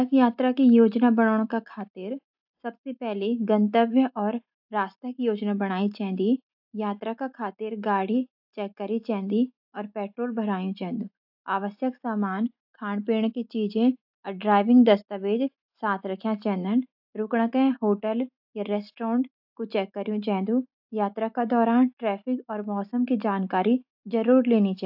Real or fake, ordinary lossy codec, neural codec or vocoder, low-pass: real; AAC, 48 kbps; none; 5.4 kHz